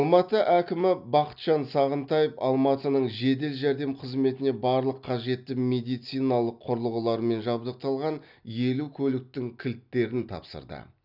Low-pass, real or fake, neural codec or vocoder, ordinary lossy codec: 5.4 kHz; real; none; none